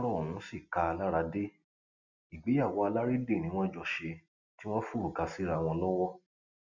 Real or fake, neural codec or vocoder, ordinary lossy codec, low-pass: real; none; none; 7.2 kHz